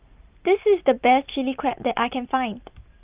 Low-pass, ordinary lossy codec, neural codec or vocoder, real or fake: 3.6 kHz; Opus, 24 kbps; none; real